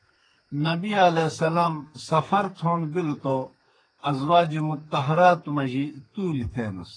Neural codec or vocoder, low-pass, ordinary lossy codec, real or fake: codec, 32 kHz, 1.9 kbps, SNAC; 9.9 kHz; AAC, 32 kbps; fake